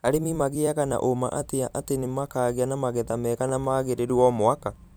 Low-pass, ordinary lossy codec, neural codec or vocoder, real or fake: none; none; vocoder, 44.1 kHz, 128 mel bands every 256 samples, BigVGAN v2; fake